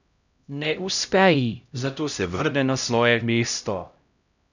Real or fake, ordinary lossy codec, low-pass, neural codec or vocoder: fake; none; 7.2 kHz; codec, 16 kHz, 0.5 kbps, X-Codec, HuBERT features, trained on LibriSpeech